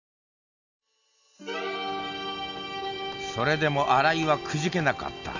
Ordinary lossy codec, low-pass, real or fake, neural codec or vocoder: none; 7.2 kHz; real; none